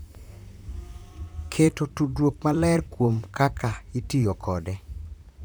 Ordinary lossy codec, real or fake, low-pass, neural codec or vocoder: none; fake; none; vocoder, 44.1 kHz, 128 mel bands, Pupu-Vocoder